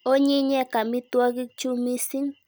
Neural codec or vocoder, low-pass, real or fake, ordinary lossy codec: none; none; real; none